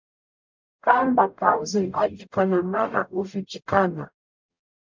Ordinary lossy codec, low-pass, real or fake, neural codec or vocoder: MP3, 64 kbps; 7.2 kHz; fake; codec, 44.1 kHz, 0.9 kbps, DAC